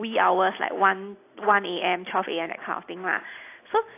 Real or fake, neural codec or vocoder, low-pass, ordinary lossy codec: real; none; 3.6 kHz; AAC, 24 kbps